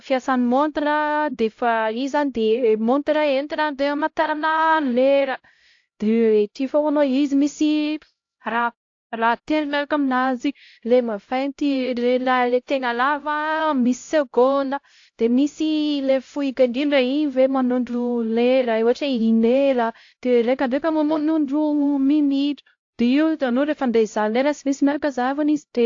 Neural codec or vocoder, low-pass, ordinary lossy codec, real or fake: codec, 16 kHz, 0.5 kbps, X-Codec, HuBERT features, trained on LibriSpeech; 7.2 kHz; AAC, 48 kbps; fake